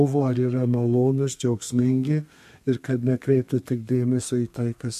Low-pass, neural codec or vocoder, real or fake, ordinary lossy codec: 14.4 kHz; codec, 32 kHz, 1.9 kbps, SNAC; fake; MP3, 64 kbps